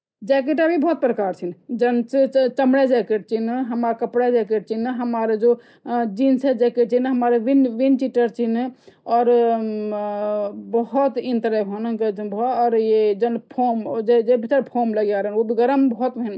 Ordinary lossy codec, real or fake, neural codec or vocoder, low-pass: none; real; none; none